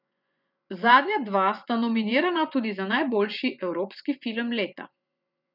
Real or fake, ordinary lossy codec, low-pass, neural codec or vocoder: fake; none; 5.4 kHz; vocoder, 24 kHz, 100 mel bands, Vocos